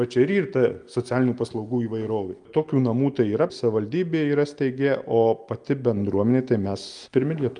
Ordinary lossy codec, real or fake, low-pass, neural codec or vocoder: Opus, 32 kbps; real; 9.9 kHz; none